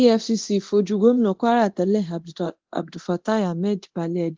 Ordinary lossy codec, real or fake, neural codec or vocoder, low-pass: Opus, 16 kbps; fake; codec, 24 kHz, 0.9 kbps, DualCodec; 7.2 kHz